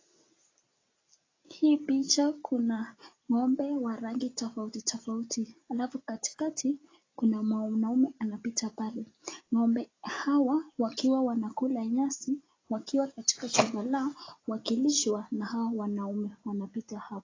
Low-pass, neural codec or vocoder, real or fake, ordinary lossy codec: 7.2 kHz; none; real; AAC, 32 kbps